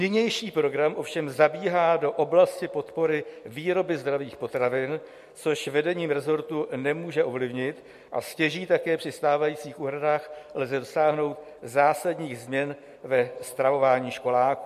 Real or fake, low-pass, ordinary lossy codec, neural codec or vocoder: real; 14.4 kHz; MP3, 64 kbps; none